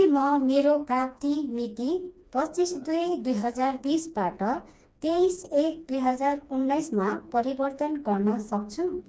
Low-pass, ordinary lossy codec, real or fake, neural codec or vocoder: none; none; fake; codec, 16 kHz, 2 kbps, FreqCodec, smaller model